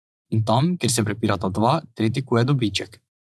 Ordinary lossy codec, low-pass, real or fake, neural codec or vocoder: none; none; fake; vocoder, 24 kHz, 100 mel bands, Vocos